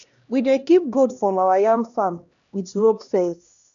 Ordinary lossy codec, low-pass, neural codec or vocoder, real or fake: none; 7.2 kHz; codec, 16 kHz, 1 kbps, X-Codec, HuBERT features, trained on LibriSpeech; fake